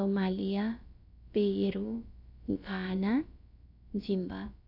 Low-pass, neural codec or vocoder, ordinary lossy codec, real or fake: 5.4 kHz; codec, 16 kHz, about 1 kbps, DyCAST, with the encoder's durations; none; fake